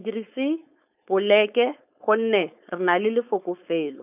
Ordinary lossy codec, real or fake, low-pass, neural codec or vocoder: none; fake; 3.6 kHz; codec, 16 kHz, 4.8 kbps, FACodec